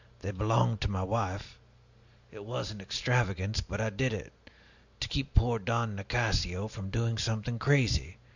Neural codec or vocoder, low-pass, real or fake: none; 7.2 kHz; real